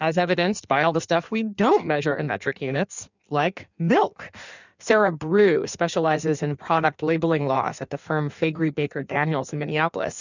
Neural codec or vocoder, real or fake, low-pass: codec, 16 kHz in and 24 kHz out, 1.1 kbps, FireRedTTS-2 codec; fake; 7.2 kHz